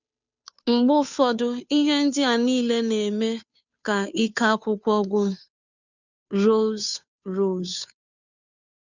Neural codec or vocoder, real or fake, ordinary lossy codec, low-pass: codec, 16 kHz, 2 kbps, FunCodec, trained on Chinese and English, 25 frames a second; fake; none; 7.2 kHz